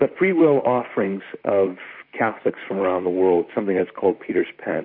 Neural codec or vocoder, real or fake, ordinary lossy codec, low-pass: vocoder, 44.1 kHz, 128 mel bands, Pupu-Vocoder; fake; MP3, 32 kbps; 5.4 kHz